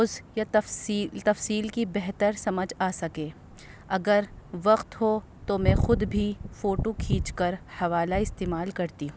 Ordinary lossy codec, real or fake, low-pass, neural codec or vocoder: none; real; none; none